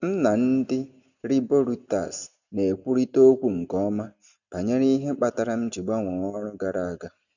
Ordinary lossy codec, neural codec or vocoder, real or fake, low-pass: none; none; real; 7.2 kHz